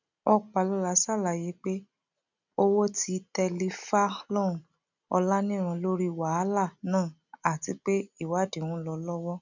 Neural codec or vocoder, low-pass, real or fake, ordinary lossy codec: none; 7.2 kHz; real; none